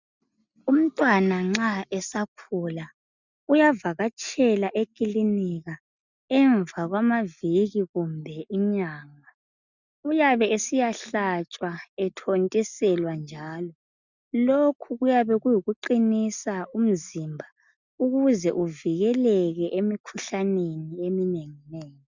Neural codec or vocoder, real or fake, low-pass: none; real; 7.2 kHz